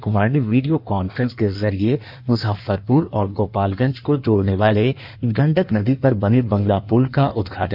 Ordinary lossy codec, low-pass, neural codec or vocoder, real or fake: none; 5.4 kHz; codec, 16 kHz in and 24 kHz out, 1.1 kbps, FireRedTTS-2 codec; fake